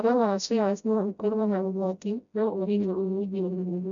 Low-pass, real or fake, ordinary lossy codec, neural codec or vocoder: 7.2 kHz; fake; none; codec, 16 kHz, 0.5 kbps, FreqCodec, smaller model